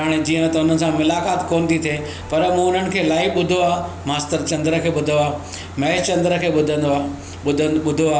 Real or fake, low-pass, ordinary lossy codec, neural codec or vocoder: real; none; none; none